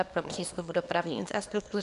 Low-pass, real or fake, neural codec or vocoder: 10.8 kHz; fake; codec, 24 kHz, 0.9 kbps, WavTokenizer, small release